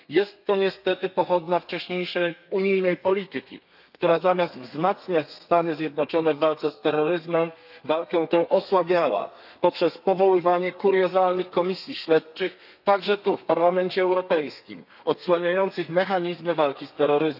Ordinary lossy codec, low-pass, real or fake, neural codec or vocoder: none; 5.4 kHz; fake; codec, 32 kHz, 1.9 kbps, SNAC